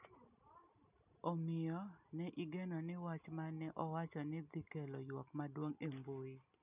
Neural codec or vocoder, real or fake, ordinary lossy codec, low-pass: none; real; none; 3.6 kHz